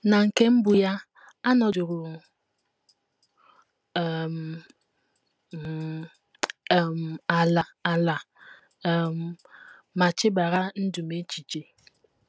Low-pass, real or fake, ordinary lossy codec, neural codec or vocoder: none; real; none; none